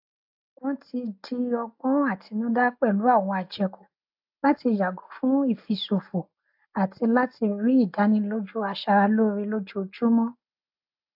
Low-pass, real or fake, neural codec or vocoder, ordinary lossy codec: 5.4 kHz; real; none; AAC, 48 kbps